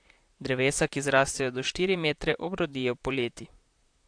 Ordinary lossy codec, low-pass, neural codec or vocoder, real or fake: AAC, 64 kbps; 9.9 kHz; vocoder, 44.1 kHz, 128 mel bands, Pupu-Vocoder; fake